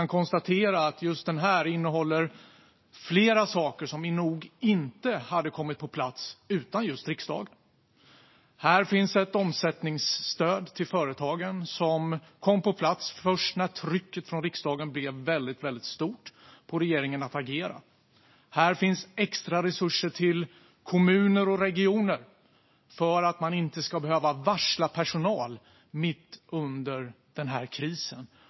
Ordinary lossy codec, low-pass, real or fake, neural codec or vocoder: MP3, 24 kbps; 7.2 kHz; real; none